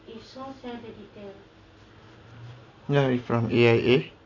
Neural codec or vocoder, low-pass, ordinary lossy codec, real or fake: none; 7.2 kHz; AAC, 48 kbps; real